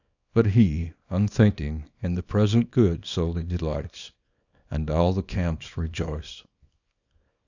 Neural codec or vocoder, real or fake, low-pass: codec, 24 kHz, 0.9 kbps, WavTokenizer, small release; fake; 7.2 kHz